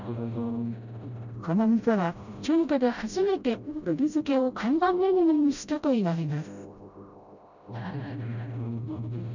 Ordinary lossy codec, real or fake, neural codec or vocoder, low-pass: none; fake; codec, 16 kHz, 0.5 kbps, FreqCodec, smaller model; 7.2 kHz